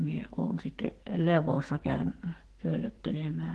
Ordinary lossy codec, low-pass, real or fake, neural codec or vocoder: Opus, 16 kbps; 10.8 kHz; fake; codec, 44.1 kHz, 2.6 kbps, SNAC